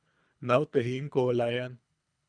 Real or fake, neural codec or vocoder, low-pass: fake; codec, 24 kHz, 3 kbps, HILCodec; 9.9 kHz